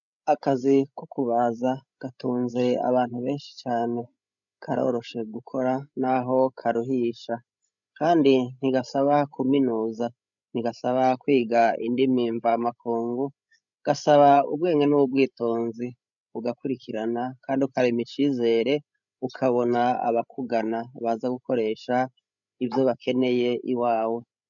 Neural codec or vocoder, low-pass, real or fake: codec, 16 kHz, 16 kbps, FreqCodec, larger model; 7.2 kHz; fake